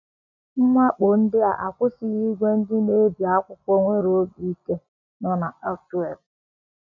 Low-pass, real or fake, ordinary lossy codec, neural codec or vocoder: 7.2 kHz; real; none; none